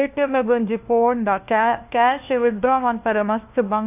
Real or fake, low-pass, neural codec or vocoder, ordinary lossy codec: fake; 3.6 kHz; codec, 16 kHz, 1 kbps, FunCodec, trained on LibriTTS, 50 frames a second; AAC, 32 kbps